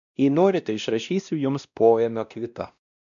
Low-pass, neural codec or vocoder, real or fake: 7.2 kHz; codec, 16 kHz, 1 kbps, X-Codec, WavLM features, trained on Multilingual LibriSpeech; fake